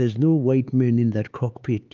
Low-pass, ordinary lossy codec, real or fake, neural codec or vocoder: 7.2 kHz; Opus, 32 kbps; fake; codec, 16 kHz, 4 kbps, X-Codec, HuBERT features, trained on LibriSpeech